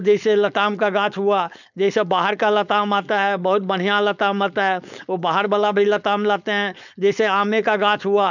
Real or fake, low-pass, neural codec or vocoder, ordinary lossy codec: fake; 7.2 kHz; codec, 16 kHz, 4.8 kbps, FACodec; none